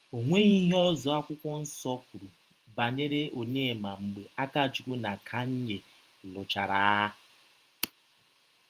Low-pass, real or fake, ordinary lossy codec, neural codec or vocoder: 14.4 kHz; fake; Opus, 32 kbps; vocoder, 48 kHz, 128 mel bands, Vocos